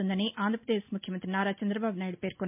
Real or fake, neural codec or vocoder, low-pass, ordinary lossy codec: real; none; 3.6 kHz; MP3, 32 kbps